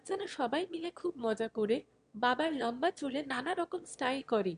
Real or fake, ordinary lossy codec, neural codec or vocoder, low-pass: fake; MP3, 64 kbps; autoencoder, 22.05 kHz, a latent of 192 numbers a frame, VITS, trained on one speaker; 9.9 kHz